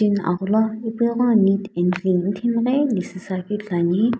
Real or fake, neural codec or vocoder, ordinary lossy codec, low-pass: real; none; none; none